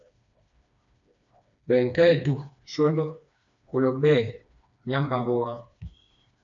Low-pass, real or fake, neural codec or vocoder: 7.2 kHz; fake; codec, 16 kHz, 2 kbps, FreqCodec, smaller model